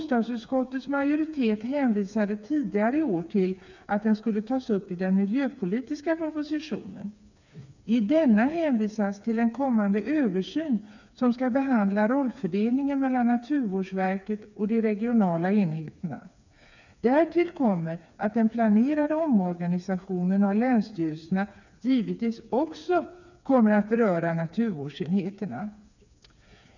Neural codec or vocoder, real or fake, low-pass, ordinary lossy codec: codec, 16 kHz, 4 kbps, FreqCodec, smaller model; fake; 7.2 kHz; none